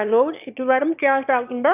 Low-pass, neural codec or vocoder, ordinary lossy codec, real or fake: 3.6 kHz; autoencoder, 22.05 kHz, a latent of 192 numbers a frame, VITS, trained on one speaker; none; fake